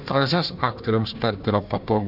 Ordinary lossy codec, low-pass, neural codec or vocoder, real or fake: AAC, 48 kbps; 5.4 kHz; codec, 16 kHz, 1 kbps, FunCodec, trained on Chinese and English, 50 frames a second; fake